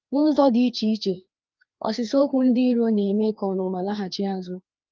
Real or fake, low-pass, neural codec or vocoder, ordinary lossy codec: fake; 7.2 kHz; codec, 16 kHz, 2 kbps, FreqCodec, larger model; Opus, 32 kbps